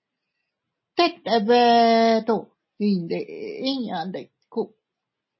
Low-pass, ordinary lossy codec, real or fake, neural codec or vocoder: 7.2 kHz; MP3, 24 kbps; real; none